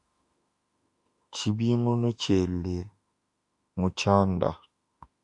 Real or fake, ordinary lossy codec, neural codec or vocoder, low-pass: fake; AAC, 64 kbps; autoencoder, 48 kHz, 32 numbers a frame, DAC-VAE, trained on Japanese speech; 10.8 kHz